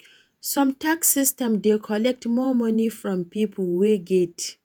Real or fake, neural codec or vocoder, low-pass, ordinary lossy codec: fake; vocoder, 48 kHz, 128 mel bands, Vocos; none; none